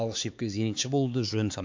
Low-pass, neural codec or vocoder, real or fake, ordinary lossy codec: 7.2 kHz; codec, 16 kHz, 4 kbps, X-Codec, HuBERT features, trained on LibriSpeech; fake; MP3, 64 kbps